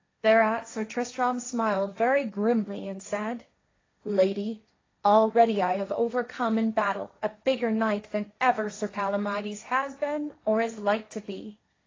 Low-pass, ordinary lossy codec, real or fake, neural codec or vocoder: 7.2 kHz; AAC, 32 kbps; fake; codec, 16 kHz, 1.1 kbps, Voila-Tokenizer